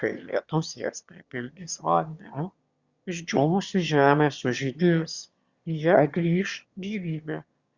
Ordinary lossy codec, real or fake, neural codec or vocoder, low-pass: Opus, 64 kbps; fake; autoencoder, 22.05 kHz, a latent of 192 numbers a frame, VITS, trained on one speaker; 7.2 kHz